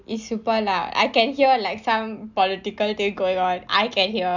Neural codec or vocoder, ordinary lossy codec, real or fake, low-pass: none; none; real; 7.2 kHz